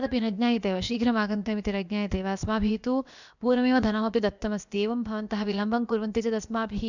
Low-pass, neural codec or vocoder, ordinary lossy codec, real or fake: 7.2 kHz; codec, 16 kHz, about 1 kbps, DyCAST, with the encoder's durations; none; fake